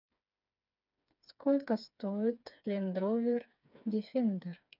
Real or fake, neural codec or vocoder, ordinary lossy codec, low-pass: fake; codec, 16 kHz, 4 kbps, FreqCodec, smaller model; none; 5.4 kHz